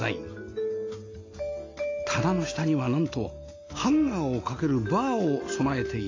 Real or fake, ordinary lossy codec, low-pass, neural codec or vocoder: real; AAC, 32 kbps; 7.2 kHz; none